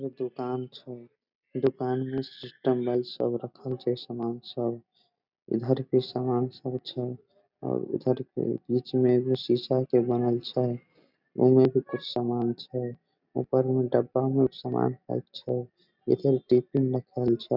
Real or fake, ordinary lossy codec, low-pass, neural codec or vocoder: real; none; 5.4 kHz; none